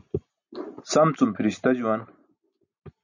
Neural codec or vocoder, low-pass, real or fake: none; 7.2 kHz; real